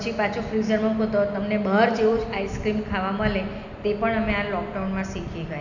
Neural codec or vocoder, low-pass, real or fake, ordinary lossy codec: none; 7.2 kHz; real; none